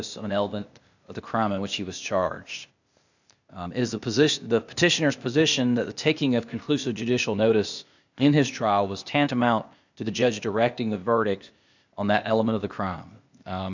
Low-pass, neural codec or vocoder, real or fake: 7.2 kHz; codec, 16 kHz, 0.8 kbps, ZipCodec; fake